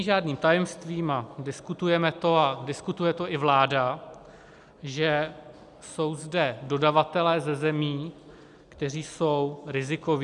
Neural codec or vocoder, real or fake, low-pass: none; real; 10.8 kHz